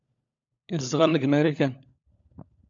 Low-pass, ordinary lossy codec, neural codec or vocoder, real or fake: 7.2 kHz; AAC, 64 kbps; codec, 16 kHz, 16 kbps, FunCodec, trained on LibriTTS, 50 frames a second; fake